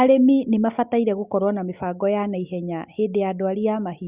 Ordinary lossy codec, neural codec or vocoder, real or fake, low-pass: Opus, 64 kbps; none; real; 3.6 kHz